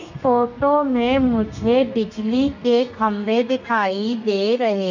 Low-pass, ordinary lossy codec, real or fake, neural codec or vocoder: 7.2 kHz; none; fake; codec, 32 kHz, 1.9 kbps, SNAC